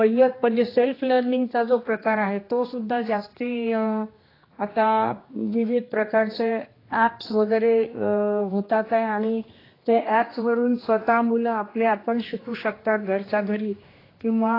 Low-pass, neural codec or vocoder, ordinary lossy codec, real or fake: 5.4 kHz; codec, 16 kHz, 2 kbps, X-Codec, HuBERT features, trained on general audio; AAC, 24 kbps; fake